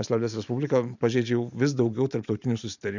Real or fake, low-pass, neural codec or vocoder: fake; 7.2 kHz; vocoder, 44.1 kHz, 128 mel bands every 512 samples, BigVGAN v2